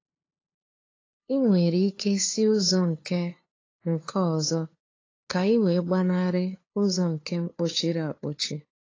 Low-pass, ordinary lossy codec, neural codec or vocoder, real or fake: 7.2 kHz; AAC, 32 kbps; codec, 16 kHz, 2 kbps, FunCodec, trained on LibriTTS, 25 frames a second; fake